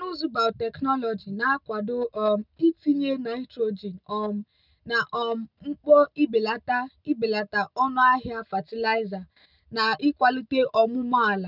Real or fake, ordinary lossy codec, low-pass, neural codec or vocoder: real; none; 5.4 kHz; none